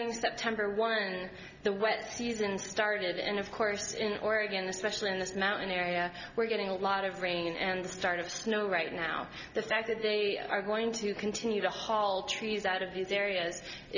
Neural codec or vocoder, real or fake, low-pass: none; real; 7.2 kHz